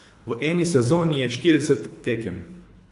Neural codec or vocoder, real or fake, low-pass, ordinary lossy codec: codec, 24 kHz, 3 kbps, HILCodec; fake; 10.8 kHz; AAC, 64 kbps